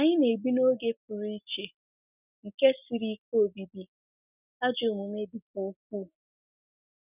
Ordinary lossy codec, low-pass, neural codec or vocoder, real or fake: none; 3.6 kHz; none; real